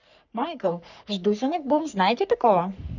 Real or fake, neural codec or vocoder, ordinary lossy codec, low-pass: fake; codec, 44.1 kHz, 3.4 kbps, Pupu-Codec; none; 7.2 kHz